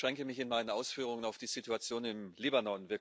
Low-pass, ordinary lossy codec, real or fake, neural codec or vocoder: none; none; real; none